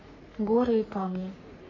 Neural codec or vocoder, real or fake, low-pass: codec, 44.1 kHz, 3.4 kbps, Pupu-Codec; fake; 7.2 kHz